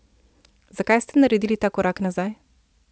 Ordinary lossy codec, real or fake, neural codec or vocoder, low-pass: none; real; none; none